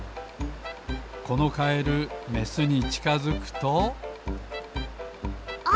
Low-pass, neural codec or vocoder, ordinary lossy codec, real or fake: none; none; none; real